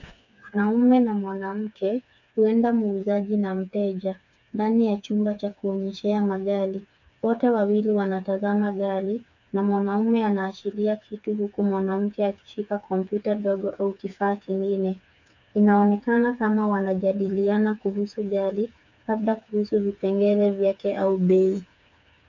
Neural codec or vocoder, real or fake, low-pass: codec, 16 kHz, 4 kbps, FreqCodec, smaller model; fake; 7.2 kHz